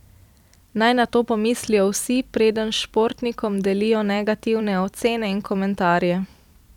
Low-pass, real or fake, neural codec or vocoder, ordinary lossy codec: 19.8 kHz; fake; vocoder, 44.1 kHz, 128 mel bands every 256 samples, BigVGAN v2; none